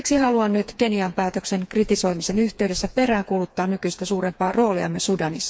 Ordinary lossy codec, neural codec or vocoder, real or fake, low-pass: none; codec, 16 kHz, 4 kbps, FreqCodec, smaller model; fake; none